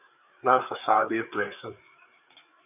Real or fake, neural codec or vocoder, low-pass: fake; codec, 16 kHz, 4 kbps, FreqCodec, larger model; 3.6 kHz